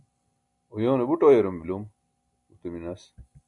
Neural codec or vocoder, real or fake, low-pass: none; real; 10.8 kHz